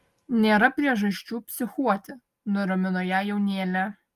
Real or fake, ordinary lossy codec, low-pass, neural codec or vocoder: real; Opus, 32 kbps; 14.4 kHz; none